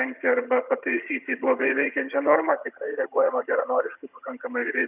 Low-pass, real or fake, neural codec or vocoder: 3.6 kHz; fake; vocoder, 22.05 kHz, 80 mel bands, HiFi-GAN